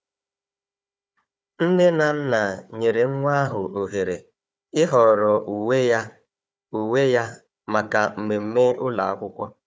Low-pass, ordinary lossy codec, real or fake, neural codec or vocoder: none; none; fake; codec, 16 kHz, 4 kbps, FunCodec, trained on Chinese and English, 50 frames a second